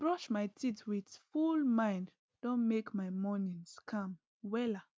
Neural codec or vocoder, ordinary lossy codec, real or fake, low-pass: none; none; real; none